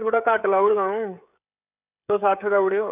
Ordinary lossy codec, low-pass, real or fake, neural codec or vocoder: none; 3.6 kHz; fake; codec, 16 kHz, 16 kbps, FreqCodec, smaller model